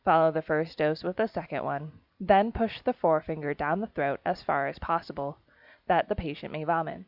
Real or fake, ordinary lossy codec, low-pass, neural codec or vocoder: real; Opus, 64 kbps; 5.4 kHz; none